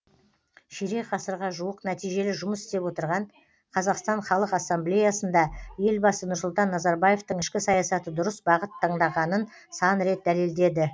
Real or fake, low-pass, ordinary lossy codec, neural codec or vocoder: real; none; none; none